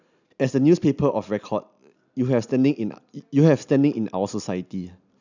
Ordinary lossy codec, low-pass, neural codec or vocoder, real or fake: none; 7.2 kHz; none; real